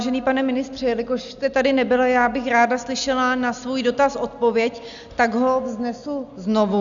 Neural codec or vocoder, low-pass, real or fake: none; 7.2 kHz; real